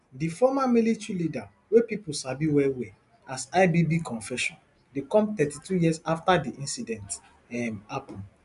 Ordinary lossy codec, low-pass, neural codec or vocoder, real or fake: AAC, 96 kbps; 10.8 kHz; none; real